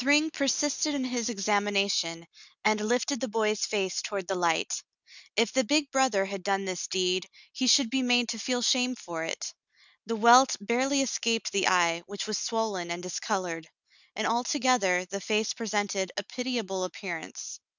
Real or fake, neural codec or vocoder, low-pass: real; none; 7.2 kHz